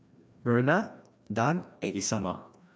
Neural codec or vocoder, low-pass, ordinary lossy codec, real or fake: codec, 16 kHz, 1 kbps, FreqCodec, larger model; none; none; fake